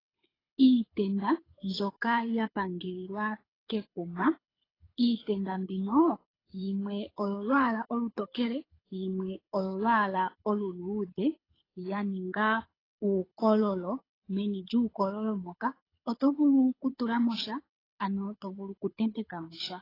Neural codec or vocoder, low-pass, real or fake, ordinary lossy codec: codec, 24 kHz, 6 kbps, HILCodec; 5.4 kHz; fake; AAC, 24 kbps